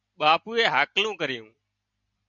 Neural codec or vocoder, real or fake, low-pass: none; real; 7.2 kHz